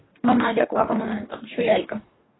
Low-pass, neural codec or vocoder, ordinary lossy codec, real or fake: 7.2 kHz; codec, 44.1 kHz, 2.6 kbps, DAC; AAC, 16 kbps; fake